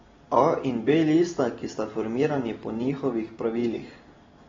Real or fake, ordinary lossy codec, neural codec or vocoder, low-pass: real; AAC, 24 kbps; none; 7.2 kHz